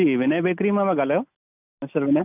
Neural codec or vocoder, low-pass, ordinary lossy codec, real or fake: none; 3.6 kHz; none; real